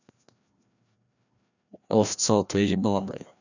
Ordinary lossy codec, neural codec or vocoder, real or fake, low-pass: none; codec, 16 kHz, 1 kbps, FreqCodec, larger model; fake; 7.2 kHz